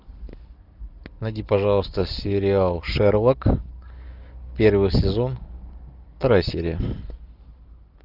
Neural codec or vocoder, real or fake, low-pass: none; real; 5.4 kHz